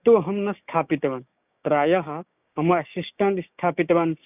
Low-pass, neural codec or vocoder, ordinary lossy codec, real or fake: 3.6 kHz; codec, 16 kHz, 6 kbps, DAC; none; fake